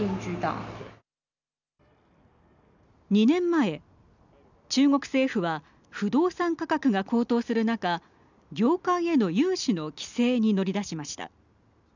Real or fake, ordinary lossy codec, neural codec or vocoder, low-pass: real; none; none; 7.2 kHz